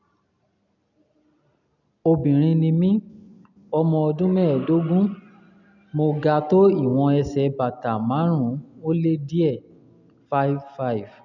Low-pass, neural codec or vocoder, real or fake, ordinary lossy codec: 7.2 kHz; none; real; none